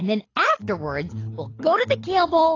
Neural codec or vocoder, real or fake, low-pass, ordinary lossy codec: codec, 24 kHz, 6 kbps, HILCodec; fake; 7.2 kHz; AAC, 32 kbps